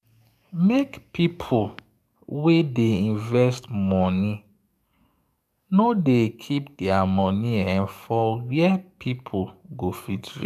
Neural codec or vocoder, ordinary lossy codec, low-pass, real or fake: codec, 44.1 kHz, 7.8 kbps, DAC; none; 14.4 kHz; fake